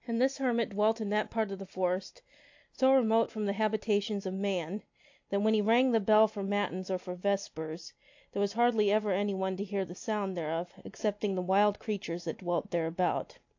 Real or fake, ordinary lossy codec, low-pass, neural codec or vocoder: real; AAC, 48 kbps; 7.2 kHz; none